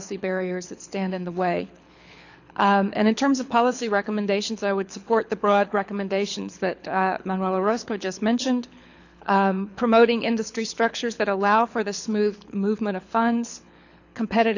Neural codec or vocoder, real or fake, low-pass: codec, 24 kHz, 6 kbps, HILCodec; fake; 7.2 kHz